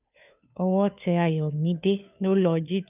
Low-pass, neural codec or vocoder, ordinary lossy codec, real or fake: 3.6 kHz; codec, 16 kHz, 2 kbps, FunCodec, trained on Chinese and English, 25 frames a second; none; fake